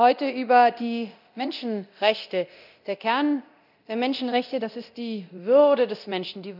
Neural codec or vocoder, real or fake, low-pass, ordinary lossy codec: codec, 24 kHz, 0.9 kbps, DualCodec; fake; 5.4 kHz; none